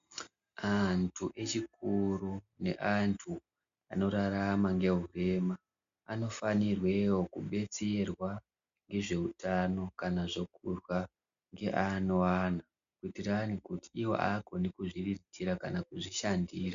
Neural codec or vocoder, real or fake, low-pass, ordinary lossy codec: none; real; 7.2 kHz; MP3, 64 kbps